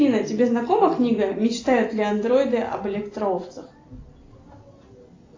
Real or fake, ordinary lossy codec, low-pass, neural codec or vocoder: real; AAC, 32 kbps; 7.2 kHz; none